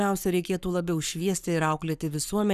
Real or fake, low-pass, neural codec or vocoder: fake; 14.4 kHz; codec, 44.1 kHz, 7.8 kbps, Pupu-Codec